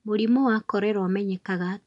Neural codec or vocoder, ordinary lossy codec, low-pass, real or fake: none; none; 10.8 kHz; real